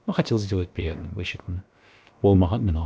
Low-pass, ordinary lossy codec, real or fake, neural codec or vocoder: none; none; fake; codec, 16 kHz, about 1 kbps, DyCAST, with the encoder's durations